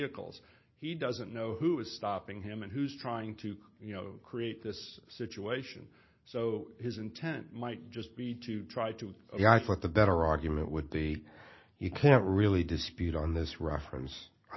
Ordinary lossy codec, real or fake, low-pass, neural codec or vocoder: MP3, 24 kbps; real; 7.2 kHz; none